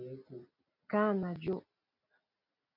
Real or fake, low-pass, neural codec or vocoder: real; 5.4 kHz; none